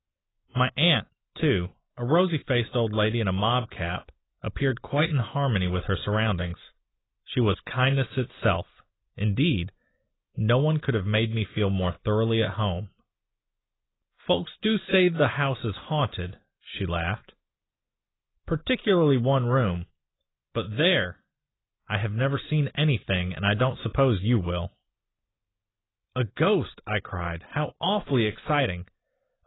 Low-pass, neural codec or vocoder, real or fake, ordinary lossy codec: 7.2 kHz; none; real; AAC, 16 kbps